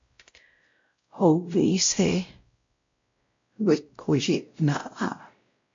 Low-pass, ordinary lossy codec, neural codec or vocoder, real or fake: 7.2 kHz; MP3, 48 kbps; codec, 16 kHz, 0.5 kbps, X-Codec, WavLM features, trained on Multilingual LibriSpeech; fake